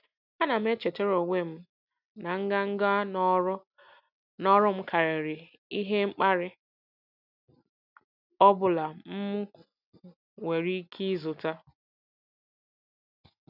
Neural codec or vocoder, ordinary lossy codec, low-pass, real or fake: none; none; 5.4 kHz; real